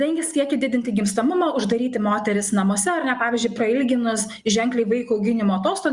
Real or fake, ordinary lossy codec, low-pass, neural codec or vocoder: real; Opus, 64 kbps; 10.8 kHz; none